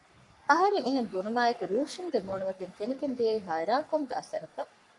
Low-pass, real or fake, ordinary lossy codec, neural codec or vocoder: 10.8 kHz; fake; MP3, 96 kbps; codec, 44.1 kHz, 3.4 kbps, Pupu-Codec